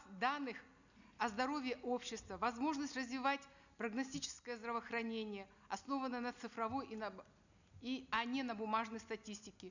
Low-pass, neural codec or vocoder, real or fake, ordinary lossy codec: 7.2 kHz; none; real; none